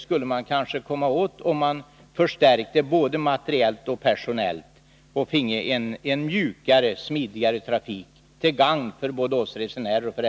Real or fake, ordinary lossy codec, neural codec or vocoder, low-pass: real; none; none; none